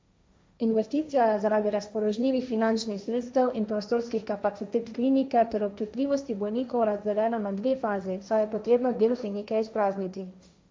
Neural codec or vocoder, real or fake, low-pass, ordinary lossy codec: codec, 16 kHz, 1.1 kbps, Voila-Tokenizer; fake; none; none